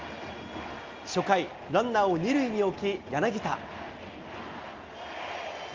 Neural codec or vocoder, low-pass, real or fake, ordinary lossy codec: none; 7.2 kHz; real; Opus, 32 kbps